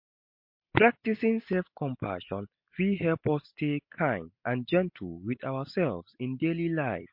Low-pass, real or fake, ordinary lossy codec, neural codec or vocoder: 5.4 kHz; real; MP3, 32 kbps; none